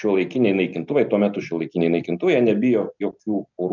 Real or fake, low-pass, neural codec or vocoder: real; 7.2 kHz; none